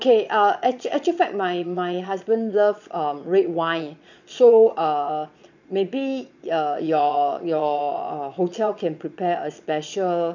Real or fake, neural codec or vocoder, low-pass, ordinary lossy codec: fake; vocoder, 22.05 kHz, 80 mel bands, Vocos; 7.2 kHz; none